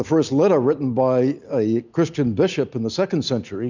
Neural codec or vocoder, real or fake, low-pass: none; real; 7.2 kHz